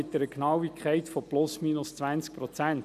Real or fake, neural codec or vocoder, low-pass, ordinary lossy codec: real; none; 14.4 kHz; none